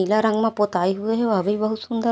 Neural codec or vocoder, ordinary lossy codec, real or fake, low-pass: none; none; real; none